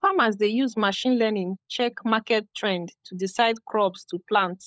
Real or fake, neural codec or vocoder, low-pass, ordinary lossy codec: fake; codec, 16 kHz, 16 kbps, FunCodec, trained on LibriTTS, 50 frames a second; none; none